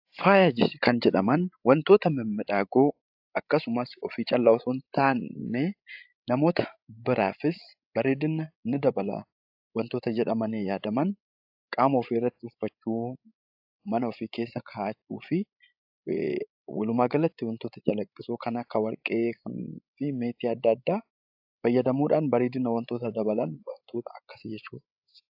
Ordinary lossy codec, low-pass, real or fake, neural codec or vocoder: AAC, 48 kbps; 5.4 kHz; fake; codec, 16 kHz, 16 kbps, FreqCodec, larger model